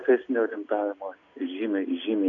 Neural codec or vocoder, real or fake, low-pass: none; real; 7.2 kHz